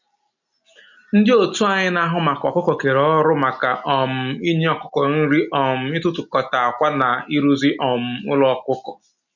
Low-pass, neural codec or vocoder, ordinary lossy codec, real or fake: 7.2 kHz; none; none; real